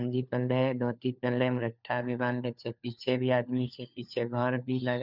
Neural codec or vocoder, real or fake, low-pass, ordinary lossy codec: codec, 16 kHz, 4 kbps, FunCodec, trained on LibriTTS, 50 frames a second; fake; 5.4 kHz; none